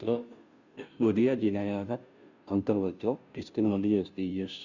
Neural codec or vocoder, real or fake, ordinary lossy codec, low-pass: codec, 16 kHz, 0.5 kbps, FunCodec, trained on Chinese and English, 25 frames a second; fake; none; 7.2 kHz